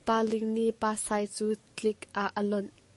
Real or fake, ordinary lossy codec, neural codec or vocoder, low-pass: fake; MP3, 48 kbps; codec, 44.1 kHz, 7.8 kbps, DAC; 14.4 kHz